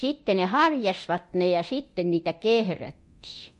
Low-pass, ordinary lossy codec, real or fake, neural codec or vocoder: 10.8 kHz; MP3, 48 kbps; fake; codec, 24 kHz, 0.9 kbps, DualCodec